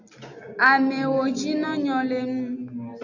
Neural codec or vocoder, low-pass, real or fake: none; 7.2 kHz; real